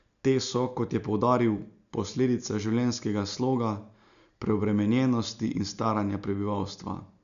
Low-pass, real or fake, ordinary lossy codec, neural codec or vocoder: 7.2 kHz; real; none; none